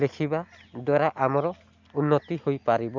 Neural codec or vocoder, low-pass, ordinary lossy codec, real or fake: none; 7.2 kHz; MP3, 64 kbps; real